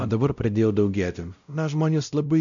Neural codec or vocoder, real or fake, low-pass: codec, 16 kHz, 0.5 kbps, X-Codec, WavLM features, trained on Multilingual LibriSpeech; fake; 7.2 kHz